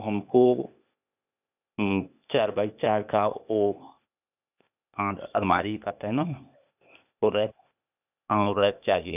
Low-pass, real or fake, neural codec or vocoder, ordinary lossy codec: 3.6 kHz; fake; codec, 16 kHz, 0.8 kbps, ZipCodec; none